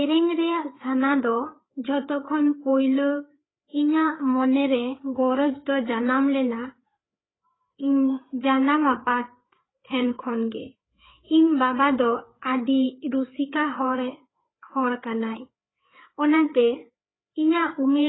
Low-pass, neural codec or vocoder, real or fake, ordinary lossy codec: 7.2 kHz; codec, 16 kHz, 4 kbps, FreqCodec, larger model; fake; AAC, 16 kbps